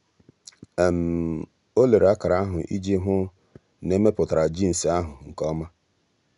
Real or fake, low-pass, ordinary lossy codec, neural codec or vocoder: real; 10.8 kHz; none; none